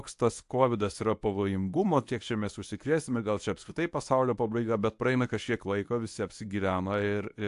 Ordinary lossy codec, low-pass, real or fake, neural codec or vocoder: AAC, 64 kbps; 10.8 kHz; fake; codec, 24 kHz, 0.9 kbps, WavTokenizer, medium speech release version 1